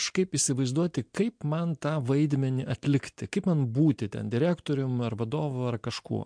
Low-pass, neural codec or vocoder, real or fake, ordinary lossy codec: 9.9 kHz; none; real; MP3, 64 kbps